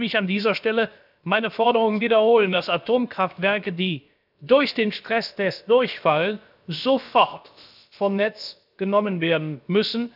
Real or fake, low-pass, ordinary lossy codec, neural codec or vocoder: fake; 5.4 kHz; none; codec, 16 kHz, about 1 kbps, DyCAST, with the encoder's durations